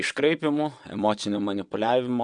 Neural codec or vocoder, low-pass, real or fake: vocoder, 22.05 kHz, 80 mel bands, WaveNeXt; 9.9 kHz; fake